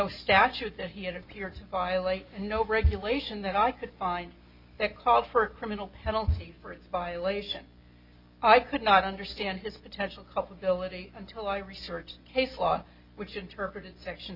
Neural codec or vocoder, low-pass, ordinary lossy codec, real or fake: none; 5.4 kHz; Opus, 64 kbps; real